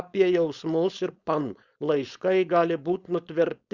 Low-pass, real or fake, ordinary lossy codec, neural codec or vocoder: 7.2 kHz; fake; Opus, 64 kbps; codec, 16 kHz, 4.8 kbps, FACodec